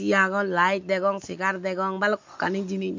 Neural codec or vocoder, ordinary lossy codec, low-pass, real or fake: none; MP3, 48 kbps; 7.2 kHz; real